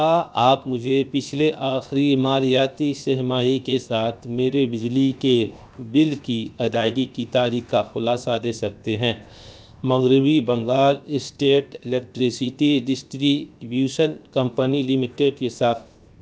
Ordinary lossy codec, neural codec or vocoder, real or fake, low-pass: none; codec, 16 kHz, 0.7 kbps, FocalCodec; fake; none